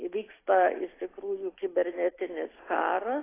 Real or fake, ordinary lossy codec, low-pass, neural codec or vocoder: real; AAC, 16 kbps; 3.6 kHz; none